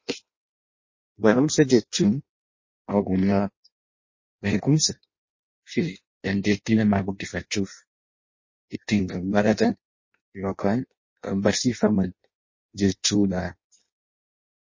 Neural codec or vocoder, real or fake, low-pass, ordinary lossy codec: codec, 16 kHz in and 24 kHz out, 0.6 kbps, FireRedTTS-2 codec; fake; 7.2 kHz; MP3, 32 kbps